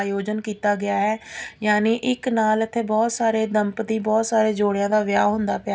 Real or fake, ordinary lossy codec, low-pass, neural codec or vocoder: real; none; none; none